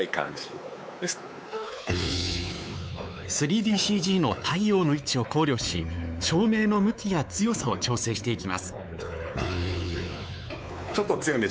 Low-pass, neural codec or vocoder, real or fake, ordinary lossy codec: none; codec, 16 kHz, 4 kbps, X-Codec, WavLM features, trained on Multilingual LibriSpeech; fake; none